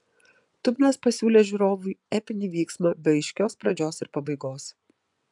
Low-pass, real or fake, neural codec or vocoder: 10.8 kHz; fake; vocoder, 24 kHz, 100 mel bands, Vocos